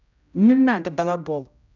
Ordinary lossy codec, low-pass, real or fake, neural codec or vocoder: none; 7.2 kHz; fake; codec, 16 kHz, 0.5 kbps, X-Codec, HuBERT features, trained on general audio